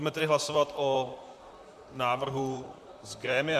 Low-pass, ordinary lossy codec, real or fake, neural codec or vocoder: 14.4 kHz; AAC, 96 kbps; fake; vocoder, 44.1 kHz, 128 mel bands, Pupu-Vocoder